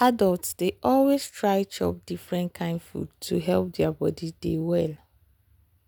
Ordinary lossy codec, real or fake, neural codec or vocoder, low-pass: none; real; none; none